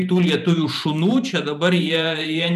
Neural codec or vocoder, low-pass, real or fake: vocoder, 44.1 kHz, 128 mel bands every 512 samples, BigVGAN v2; 14.4 kHz; fake